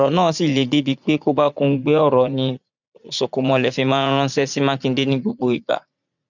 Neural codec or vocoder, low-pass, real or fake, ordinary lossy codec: vocoder, 44.1 kHz, 80 mel bands, Vocos; 7.2 kHz; fake; none